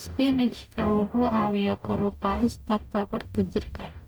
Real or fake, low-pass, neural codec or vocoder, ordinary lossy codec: fake; none; codec, 44.1 kHz, 0.9 kbps, DAC; none